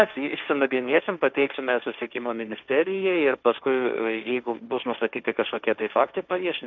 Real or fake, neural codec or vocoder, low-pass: fake; codec, 16 kHz, 1.1 kbps, Voila-Tokenizer; 7.2 kHz